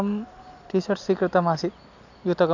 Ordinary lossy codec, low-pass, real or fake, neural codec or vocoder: none; 7.2 kHz; real; none